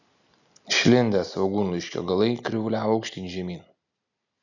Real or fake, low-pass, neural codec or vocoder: real; 7.2 kHz; none